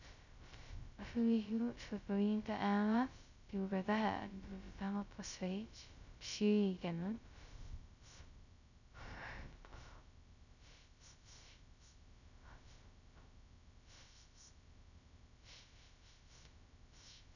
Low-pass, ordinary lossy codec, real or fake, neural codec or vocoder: 7.2 kHz; none; fake; codec, 16 kHz, 0.2 kbps, FocalCodec